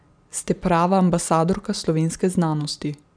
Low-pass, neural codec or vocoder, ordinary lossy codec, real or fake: 9.9 kHz; none; none; real